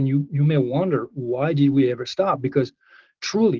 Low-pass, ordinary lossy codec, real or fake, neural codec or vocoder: 7.2 kHz; Opus, 16 kbps; real; none